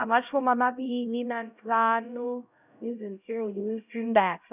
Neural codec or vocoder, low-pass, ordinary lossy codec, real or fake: codec, 16 kHz, 0.5 kbps, X-Codec, HuBERT features, trained on LibriSpeech; 3.6 kHz; none; fake